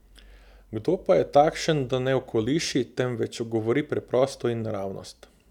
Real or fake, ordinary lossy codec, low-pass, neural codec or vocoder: real; none; 19.8 kHz; none